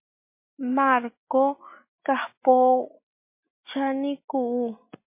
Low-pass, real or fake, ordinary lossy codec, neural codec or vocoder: 3.6 kHz; real; MP3, 24 kbps; none